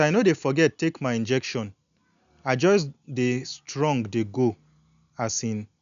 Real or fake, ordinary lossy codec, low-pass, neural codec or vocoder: real; none; 7.2 kHz; none